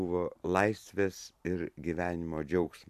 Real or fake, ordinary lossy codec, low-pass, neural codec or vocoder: fake; AAC, 96 kbps; 14.4 kHz; vocoder, 44.1 kHz, 128 mel bands every 256 samples, BigVGAN v2